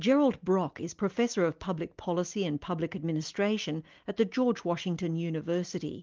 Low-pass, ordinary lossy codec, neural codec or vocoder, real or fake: 7.2 kHz; Opus, 32 kbps; none; real